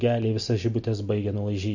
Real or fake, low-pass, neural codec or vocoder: real; 7.2 kHz; none